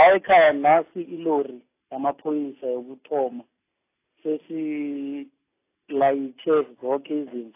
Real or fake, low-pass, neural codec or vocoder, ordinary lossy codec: real; 3.6 kHz; none; AAC, 24 kbps